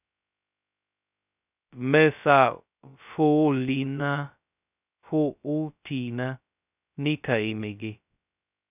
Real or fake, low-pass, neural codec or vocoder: fake; 3.6 kHz; codec, 16 kHz, 0.2 kbps, FocalCodec